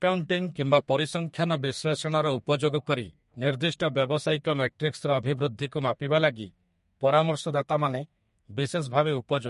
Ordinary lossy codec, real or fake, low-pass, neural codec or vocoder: MP3, 48 kbps; fake; 14.4 kHz; codec, 32 kHz, 1.9 kbps, SNAC